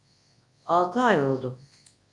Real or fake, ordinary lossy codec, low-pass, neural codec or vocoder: fake; Opus, 64 kbps; 10.8 kHz; codec, 24 kHz, 0.9 kbps, WavTokenizer, large speech release